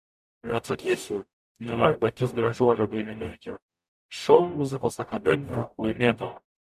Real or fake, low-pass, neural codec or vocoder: fake; 14.4 kHz; codec, 44.1 kHz, 0.9 kbps, DAC